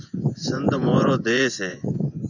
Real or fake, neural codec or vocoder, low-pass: fake; vocoder, 24 kHz, 100 mel bands, Vocos; 7.2 kHz